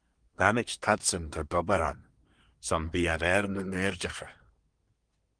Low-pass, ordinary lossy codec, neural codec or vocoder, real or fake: 9.9 kHz; Opus, 24 kbps; codec, 24 kHz, 1 kbps, SNAC; fake